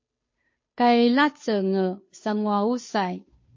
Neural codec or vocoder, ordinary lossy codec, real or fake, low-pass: codec, 16 kHz, 2 kbps, FunCodec, trained on Chinese and English, 25 frames a second; MP3, 32 kbps; fake; 7.2 kHz